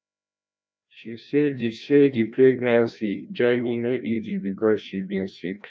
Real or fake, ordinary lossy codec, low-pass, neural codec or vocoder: fake; none; none; codec, 16 kHz, 1 kbps, FreqCodec, larger model